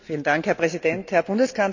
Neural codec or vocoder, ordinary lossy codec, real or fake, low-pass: none; none; real; 7.2 kHz